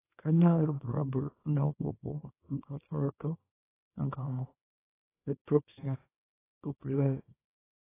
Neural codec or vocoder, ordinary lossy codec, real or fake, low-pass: codec, 24 kHz, 0.9 kbps, WavTokenizer, small release; AAC, 16 kbps; fake; 3.6 kHz